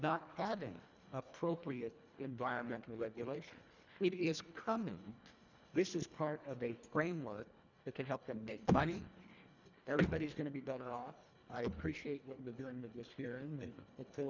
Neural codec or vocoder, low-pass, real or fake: codec, 24 kHz, 1.5 kbps, HILCodec; 7.2 kHz; fake